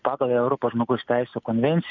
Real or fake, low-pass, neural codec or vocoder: real; 7.2 kHz; none